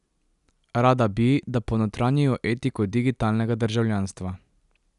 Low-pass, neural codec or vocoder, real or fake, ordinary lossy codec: 10.8 kHz; none; real; none